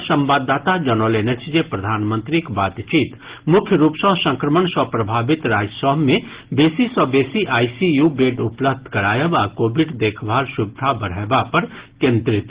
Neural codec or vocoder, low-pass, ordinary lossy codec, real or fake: none; 3.6 kHz; Opus, 16 kbps; real